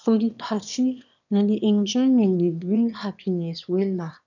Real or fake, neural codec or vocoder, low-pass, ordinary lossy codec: fake; autoencoder, 22.05 kHz, a latent of 192 numbers a frame, VITS, trained on one speaker; 7.2 kHz; none